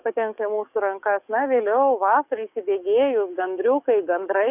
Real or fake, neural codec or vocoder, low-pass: fake; codec, 44.1 kHz, 7.8 kbps, DAC; 3.6 kHz